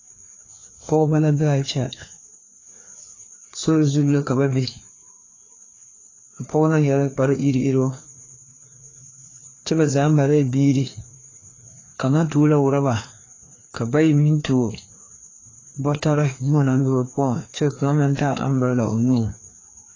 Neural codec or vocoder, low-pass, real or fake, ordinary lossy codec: codec, 16 kHz, 2 kbps, FreqCodec, larger model; 7.2 kHz; fake; AAC, 32 kbps